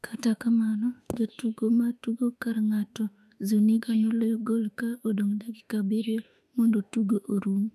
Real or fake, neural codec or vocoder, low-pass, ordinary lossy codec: fake; autoencoder, 48 kHz, 32 numbers a frame, DAC-VAE, trained on Japanese speech; 14.4 kHz; none